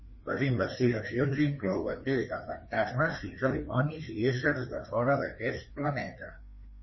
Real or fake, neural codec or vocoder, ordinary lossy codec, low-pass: fake; codec, 16 kHz, 2 kbps, FreqCodec, larger model; MP3, 24 kbps; 7.2 kHz